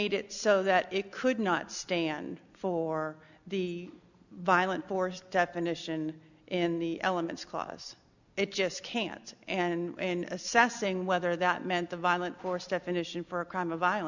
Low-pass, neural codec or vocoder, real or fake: 7.2 kHz; none; real